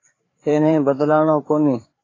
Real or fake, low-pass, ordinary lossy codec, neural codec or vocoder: fake; 7.2 kHz; AAC, 32 kbps; codec, 16 kHz, 4 kbps, FreqCodec, larger model